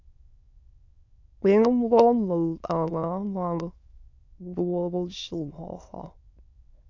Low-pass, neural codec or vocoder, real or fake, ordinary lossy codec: 7.2 kHz; autoencoder, 22.05 kHz, a latent of 192 numbers a frame, VITS, trained on many speakers; fake; MP3, 64 kbps